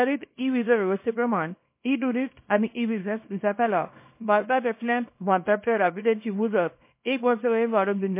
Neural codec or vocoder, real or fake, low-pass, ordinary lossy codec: codec, 24 kHz, 0.9 kbps, WavTokenizer, small release; fake; 3.6 kHz; MP3, 24 kbps